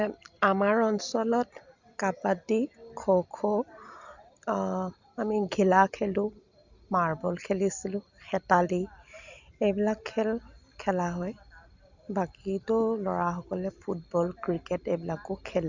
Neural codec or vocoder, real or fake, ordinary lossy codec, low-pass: none; real; Opus, 64 kbps; 7.2 kHz